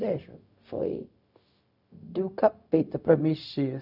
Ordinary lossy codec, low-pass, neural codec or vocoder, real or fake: none; 5.4 kHz; codec, 16 kHz, 0.4 kbps, LongCat-Audio-Codec; fake